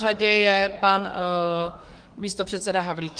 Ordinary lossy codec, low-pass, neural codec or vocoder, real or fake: Opus, 24 kbps; 9.9 kHz; codec, 24 kHz, 1 kbps, SNAC; fake